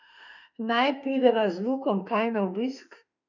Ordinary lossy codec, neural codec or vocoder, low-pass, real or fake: none; autoencoder, 48 kHz, 32 numbers a frame, DAC-VAE, trained on Japanese speech; 7.2 kHz; fake